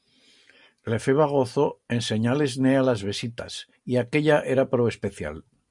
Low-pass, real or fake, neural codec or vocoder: 10.8 kHz; real; none